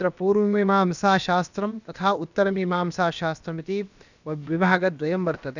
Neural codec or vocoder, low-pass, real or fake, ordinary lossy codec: codec, 16 kHz, about 1 kbps, DyCAST, with the encoder's durations; 7.2 kHz; fake; none